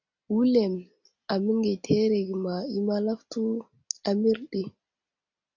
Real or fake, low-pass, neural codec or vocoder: real; 7.2 kHz; none